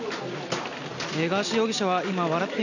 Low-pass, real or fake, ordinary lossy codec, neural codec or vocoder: 7.2 kHz; real; none; none